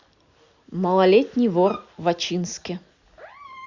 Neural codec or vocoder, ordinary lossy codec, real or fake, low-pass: none; Opus, 64 kbps; real; 7.2 kHz